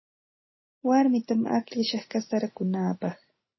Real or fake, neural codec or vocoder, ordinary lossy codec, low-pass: real; none; MP3, 24 kbps; 7.2 kHz